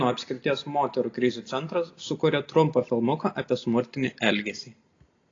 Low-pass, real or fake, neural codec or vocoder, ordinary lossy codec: 7.2 kHz; real; none; AAC, 32 kbps